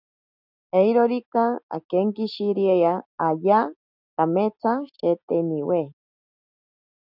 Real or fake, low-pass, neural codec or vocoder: real; 5.4 kHz; none